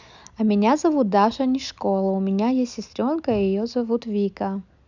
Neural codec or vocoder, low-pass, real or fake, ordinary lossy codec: none; 7.2 kHz; real; none